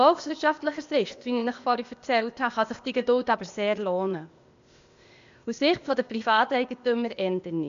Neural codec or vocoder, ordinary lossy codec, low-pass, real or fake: codec, 16 kHz, 0.8 kbps, ZipCodec; MP3, 64 kbps; 7.2 kHz; fake